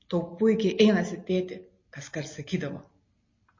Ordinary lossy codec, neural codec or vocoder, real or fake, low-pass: MP3, 32 kbps; none; real; 7.2 kHz